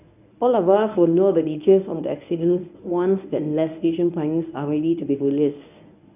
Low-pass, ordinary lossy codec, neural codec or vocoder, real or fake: 3.6 kHz; none; codec, 24 kHz, 0.9 kbps, WavTokenizer, medium speech release version 1; fake